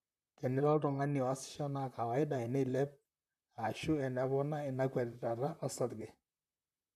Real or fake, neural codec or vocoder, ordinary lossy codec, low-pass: fake; vocoder, 44.1 kHz, 128 mel bands, Pupu-Vocoder; none; 14.4 kHz